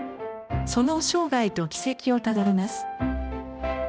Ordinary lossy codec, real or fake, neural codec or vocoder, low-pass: none; fake; codec, 16 kHz, 1 kbps, X-Codec, HuBERT features, trained on balanced general audio; none